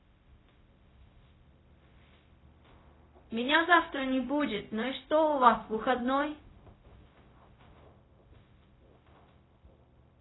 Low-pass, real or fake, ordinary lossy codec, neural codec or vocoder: 7.2 kHz; fake; AAC, 16 kbps; codec, 16 kHz, 0.4 kbps, LongCat-Audio-Codec